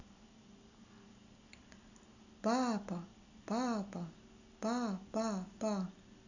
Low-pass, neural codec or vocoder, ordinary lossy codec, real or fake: 7.2 kHz; none; none; real